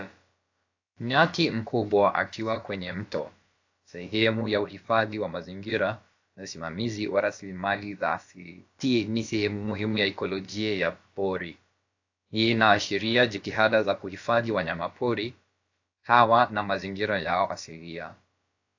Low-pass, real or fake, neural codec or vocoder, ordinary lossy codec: 7.2 kHz; fake; codec, 16 kHz, about 1 kbps, DyCAST, with the encoder's durations; AAC, 48 kbps